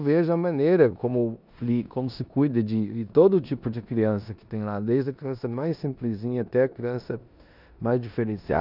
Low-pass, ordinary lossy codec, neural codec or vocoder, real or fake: 5.4 kHz; none; codec, 16 kHz in and 24 kHz out, 0.9 kbps, LongCat-Audio-Codec, four codebook decoder; fake